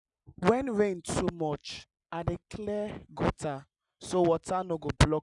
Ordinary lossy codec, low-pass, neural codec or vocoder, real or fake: none; 10.8 kHz; none; real